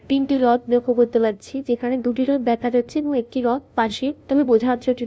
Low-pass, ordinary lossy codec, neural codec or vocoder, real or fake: none; none; codec, 16 kHz, 0.5 kbps, FunCodec, trained on LibriTTS, 25 frames a second; fake